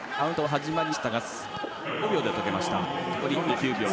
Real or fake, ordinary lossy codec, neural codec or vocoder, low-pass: real; none; none; none